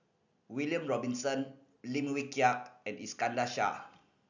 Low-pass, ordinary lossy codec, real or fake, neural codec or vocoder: 7.2 kHz; none; real; none